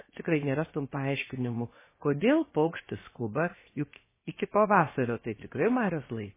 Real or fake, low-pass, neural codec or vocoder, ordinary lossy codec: fake; 3.6 kHz; codec, 16 kHz, 0.7 kbps, FocalCodec; MP3, 16 kbps